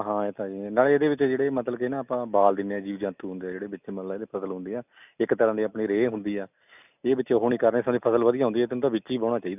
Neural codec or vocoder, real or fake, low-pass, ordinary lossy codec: none; real; 3.6 kHz; none